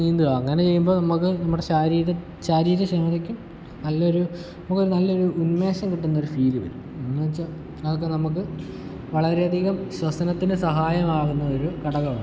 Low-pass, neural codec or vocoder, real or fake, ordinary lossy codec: none; none; real; none